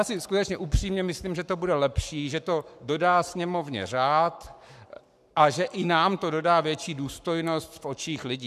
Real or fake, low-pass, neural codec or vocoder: fake; 14.4 kHz; codec, 44.1 kHz, 7.8 kbps, Pupu-Codec